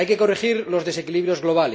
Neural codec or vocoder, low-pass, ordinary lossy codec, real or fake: none; none; none; real